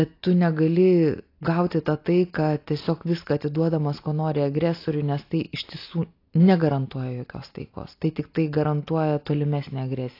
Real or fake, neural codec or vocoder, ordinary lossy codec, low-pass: real; none; AAC, 32 kbps; 5.4 kHz